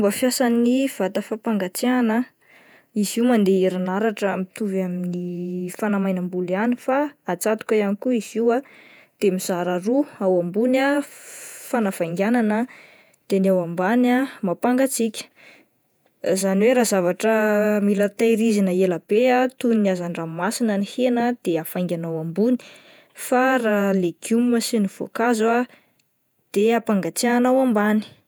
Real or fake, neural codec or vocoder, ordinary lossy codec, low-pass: fake; vocoder, 48 kHz, 128 mel bands, Vocos; none; none